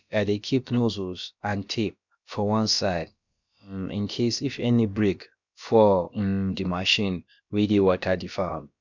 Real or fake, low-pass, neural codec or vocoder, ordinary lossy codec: fake; 7.2 kHz; codec, 16 kHz, about 1 kbps, DyCAST, with the encoder's durations; none